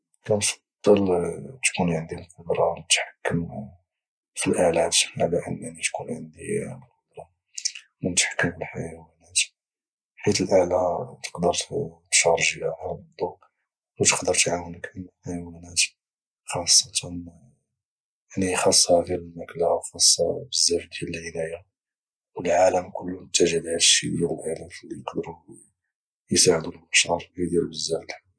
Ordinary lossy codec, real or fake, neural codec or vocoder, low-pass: none; real; none; none